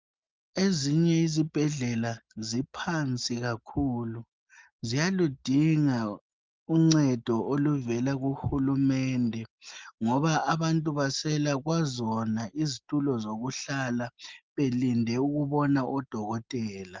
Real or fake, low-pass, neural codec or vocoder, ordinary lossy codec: real; 7.2 kHz; none; Opus, 32 kbps